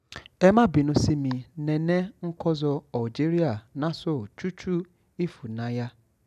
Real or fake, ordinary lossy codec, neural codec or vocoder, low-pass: real; none; none; 14.4 kHz